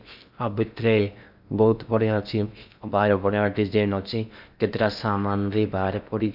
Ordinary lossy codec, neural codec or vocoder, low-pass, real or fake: none; codec, 16 kHz in and 24 kHz out, 0.6 kbps, FocalCodec, streaming, 2048 codes; 5.4 kHz; fake